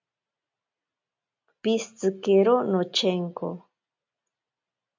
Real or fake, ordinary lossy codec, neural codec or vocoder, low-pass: real; MP3, 64 kbps; none; 7.2 kHz